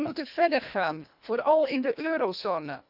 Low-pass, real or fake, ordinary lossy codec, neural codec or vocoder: 5.4 kHz; fake; none; codec, 24 kHz, 1.5 kbps, HILCodec